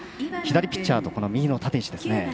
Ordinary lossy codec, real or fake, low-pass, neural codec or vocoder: none; real; none; none